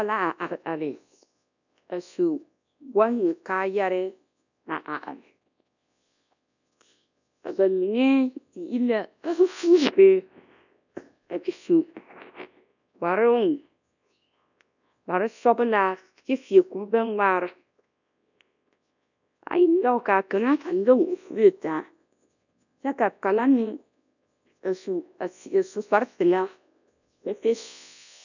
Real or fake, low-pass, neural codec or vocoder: fake; 7.2 kHz; codec, 24 kHz, 0.9 kbps, WavTokenizer, large speech release